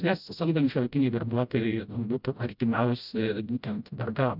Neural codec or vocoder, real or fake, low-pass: codec, 16 kHz, 0.5 kbps, FreqCodec, smaller model; fake; 5.4 kHz